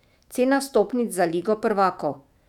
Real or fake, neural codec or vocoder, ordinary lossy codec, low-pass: fake; autoencoder, 48 kHz, 128 numbers a frame, DAC-VAE, trained on Japanese speech; none; 19.8 kHz